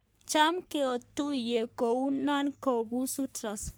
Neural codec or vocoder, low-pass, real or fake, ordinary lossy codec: codec, 44.1 kHz, 3.4 kbps, Pupu-Codec; none; fake; none